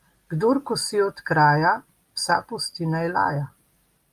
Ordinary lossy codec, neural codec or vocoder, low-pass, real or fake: Opus, 32 kbps; none; 14.4 kHz; real